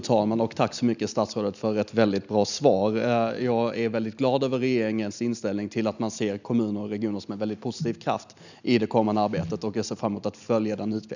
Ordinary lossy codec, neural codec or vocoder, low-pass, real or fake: none; none; 7.2 kHz; real